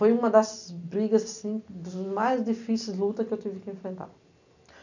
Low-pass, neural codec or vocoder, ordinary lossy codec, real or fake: 7.2 kHz; none; none; real